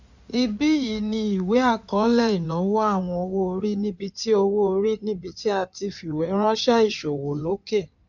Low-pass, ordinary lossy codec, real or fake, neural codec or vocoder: 7.2 kHz; none; fake; codec, 16 kHz in and 24 kHz out, 2.2 kbps, FireRedTTS-2 codec